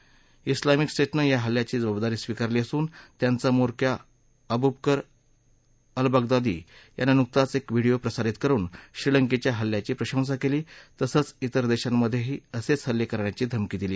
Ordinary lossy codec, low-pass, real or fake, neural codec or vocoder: none; none; real; none